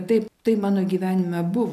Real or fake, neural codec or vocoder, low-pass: real; none; 14.4 kHz